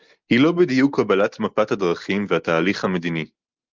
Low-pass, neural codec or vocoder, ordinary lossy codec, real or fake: 7.2 kHz; none; Opus, 32 kbps; real